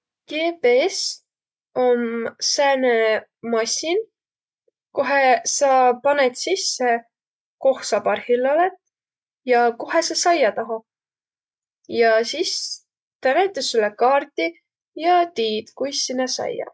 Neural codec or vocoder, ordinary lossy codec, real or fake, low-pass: none; none; real; none